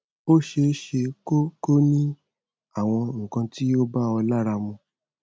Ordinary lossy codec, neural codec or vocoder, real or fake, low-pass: none; none; real; none